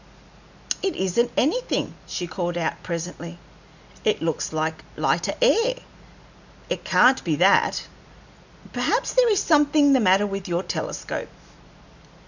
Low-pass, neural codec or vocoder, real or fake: 7.2 kHz; none; real